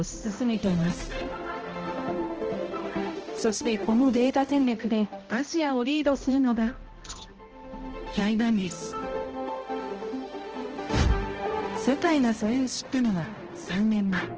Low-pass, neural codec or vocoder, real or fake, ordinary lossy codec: 7.2 kHz; codec, 16 kHz, 0.5 kbps, X-Codec, HuBERT features, trained on balanced general audio; fake; Opus, 16 kbps